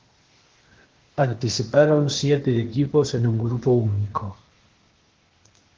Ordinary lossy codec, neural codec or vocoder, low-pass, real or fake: Opus, 16 kbps; codec, 16 kHz, 0.8 kbps, ZipCodec; 7.2 kHz; fake